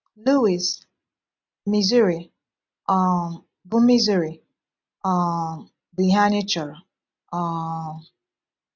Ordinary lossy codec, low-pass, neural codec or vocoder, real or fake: none; 7.2 kHz; none; real